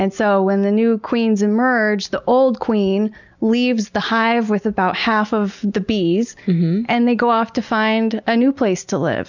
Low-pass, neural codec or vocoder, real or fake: 7.2 kHz; none; real